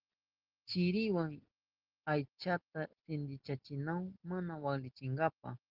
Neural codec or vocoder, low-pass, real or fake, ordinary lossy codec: none; 5.4 kHz; real; Opus, 16 kbps